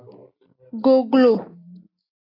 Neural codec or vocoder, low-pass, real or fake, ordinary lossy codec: none; 5.4 kHz; real; AAC, 24 kbps